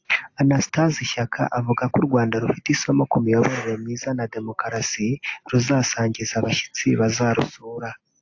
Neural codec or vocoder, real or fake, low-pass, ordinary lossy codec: none; real; 7.2 kHz; AAC, 48 kbps